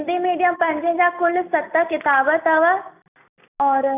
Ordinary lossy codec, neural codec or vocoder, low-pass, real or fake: none; none; 3.6 kHz; real